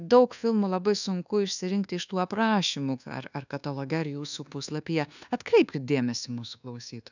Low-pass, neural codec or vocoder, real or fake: 7.2 kHz; codec, 24 kHz, 1.2 kbps, DualCodec; fake